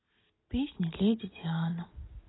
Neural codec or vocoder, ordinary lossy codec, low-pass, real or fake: none; AAC, 16 kbps; 7.2 kHz; real